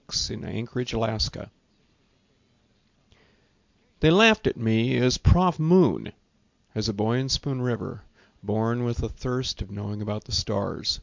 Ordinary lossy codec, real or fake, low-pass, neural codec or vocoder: MP3, 64 kbps; real; 7.2 kHz; none